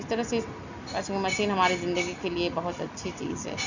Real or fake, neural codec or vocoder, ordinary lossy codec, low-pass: real; none; none; 7.2 kHz